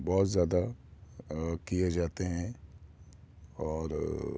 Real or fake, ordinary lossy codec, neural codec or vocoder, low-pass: real; none; none; none